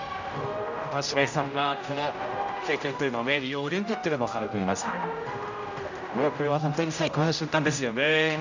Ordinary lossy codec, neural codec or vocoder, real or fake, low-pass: none; codec, 16 kHz, 0.5 kbps, X-Codec, HuBERT features, trained on general audio; fake; 7.2 kHz